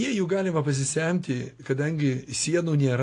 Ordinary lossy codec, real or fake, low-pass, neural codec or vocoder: AAC, 48 kbps; real; 10.8 kHz; none